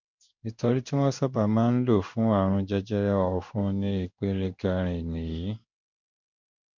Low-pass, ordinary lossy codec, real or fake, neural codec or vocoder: 7.2 kHz; none; fake; codec, 16 kHz in and 24 kHz out, 1 kbps, XY-Tokenizer